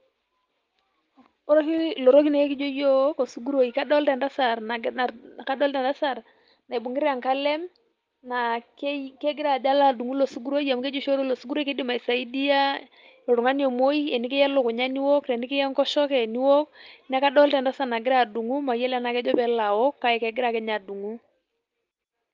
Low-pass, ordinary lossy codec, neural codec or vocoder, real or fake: 7.2 kHz; Opus, 32 kbps; none; real